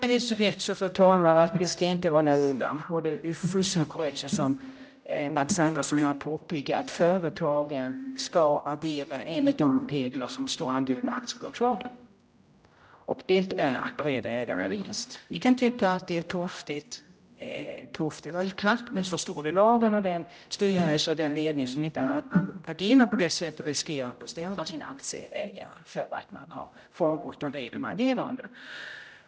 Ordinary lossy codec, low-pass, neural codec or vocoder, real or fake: none; none; codec, 16 kHz, 0.5 kbps, X-Codec, HuBERT features, trained on general audio; fake